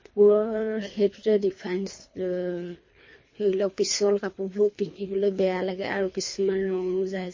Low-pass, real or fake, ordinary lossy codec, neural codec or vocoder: 7.2 kHz; fake; MP3, 32 kbps; codec, 24 kHz, 3 kbps, HILCodec